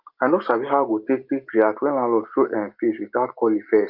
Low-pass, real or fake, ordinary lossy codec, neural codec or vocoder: 5.4 kHz; real; Opus, 24 kbps; none